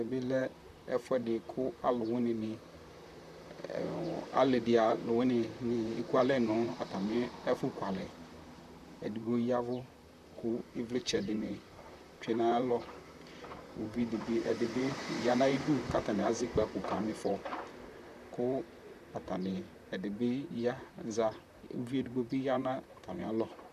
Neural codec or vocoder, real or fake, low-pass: vocoder, 44.1 kHz, 128 mel bands, Pupu-Vocoder; fake; 14.4 kHz